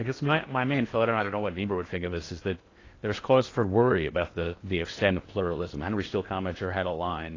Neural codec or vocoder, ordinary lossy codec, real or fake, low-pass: codec, 16 kHz in and 24 kHz out, 0.8 kbps, FocalCodec, streaming, 65536 codes; AAC, 32 kbps; fake; 7.2 kHz